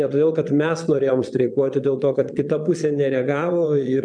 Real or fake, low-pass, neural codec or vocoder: fake; 9.9 kHz; vocoder, 22.05 kHz, 80 mel bands, Vocos